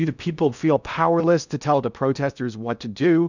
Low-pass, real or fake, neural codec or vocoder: 7.2 kHz; fake; codec, 16 kHz in and 24 kHz out, 0.6 kbps, FocalCodec, streaming, 4096 codes